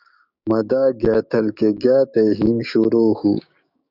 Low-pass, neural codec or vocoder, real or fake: 5.4 kHz; codec, 16 kHz, 6 kbps, DAC; fake